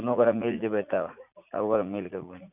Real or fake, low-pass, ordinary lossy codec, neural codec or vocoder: fake; 3.6 kHz; none; vocoder, 44.1 kHz, 80 mel bands, Vocos